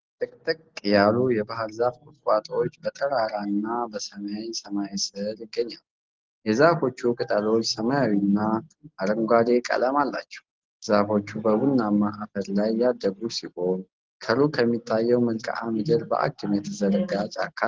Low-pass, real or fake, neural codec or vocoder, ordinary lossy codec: 7.2 kHz; real; none; Opus, 16 kbps